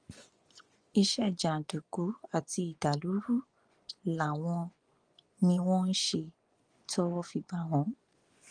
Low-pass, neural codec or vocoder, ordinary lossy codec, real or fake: 9.9 kHz; vocoder, 24 kHz, 100 mel bands, Vocos; Opus, 24 kbps; fake